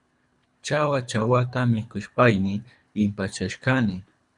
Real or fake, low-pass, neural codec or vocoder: fake; 10.8 kHz; codec, 24 kHz, 3 kbps, HILCodec